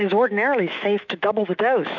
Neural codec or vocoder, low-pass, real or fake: autoencoder, 48 kHz, 128 numbers a frame, DAC-VAE, trained on Japanese speech; 7.2 kHz; fake